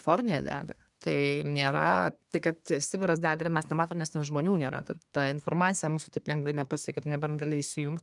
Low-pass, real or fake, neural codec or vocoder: 10.8 kHz; fake; codec, 24 kHz, 1 kbps, SNAC